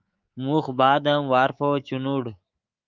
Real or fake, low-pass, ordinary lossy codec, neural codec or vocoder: fake; 7.2 kHz; Opus, 24 kbps; codec, 24 kHz, 3.1 kbps, DualCodec